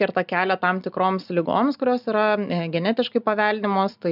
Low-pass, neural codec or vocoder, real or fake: 5.4 kHz; none; real